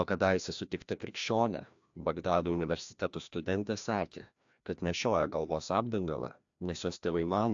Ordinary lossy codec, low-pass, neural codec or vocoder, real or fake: AAC, 64 kbps; 7.2 kHz; codec, 16 kHz, 1 kbps, FreqCodec, larger model; fake